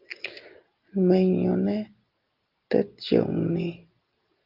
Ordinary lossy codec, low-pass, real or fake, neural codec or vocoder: Opus, 32 kbps; 5.4 kHz; real; none